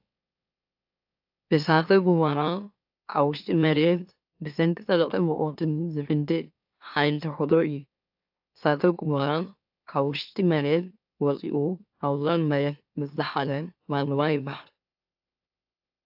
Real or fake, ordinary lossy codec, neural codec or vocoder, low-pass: fake; AAC, 48 kbps; autoencoder, 44.1 kHz, a latent of 192 numbers a frame, MeloTTS; 5.4 kHz